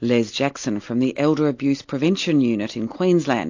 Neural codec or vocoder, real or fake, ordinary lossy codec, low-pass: none; real; AAC, 48 kbps; 7.2 kHz